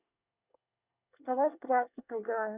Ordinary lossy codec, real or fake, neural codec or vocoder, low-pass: none; fake; codec, 24 kHz, 1 kbps, SNAC; 3.6 kHz